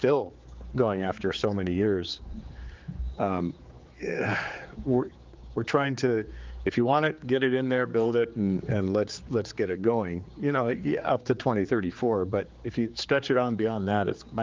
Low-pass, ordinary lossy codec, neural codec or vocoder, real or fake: 7.2 kHz; Opus, 32 kbps; codec, 16 kHz, 4 kbps, X-Codec, HuBERT features, trained on general audio; fake